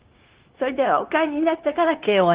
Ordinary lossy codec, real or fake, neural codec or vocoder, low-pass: Opus, 16 kbps; fake; codec, 16 kHz, 0.9 kbps, LongCat-Audio-Codec; 3.6 kHz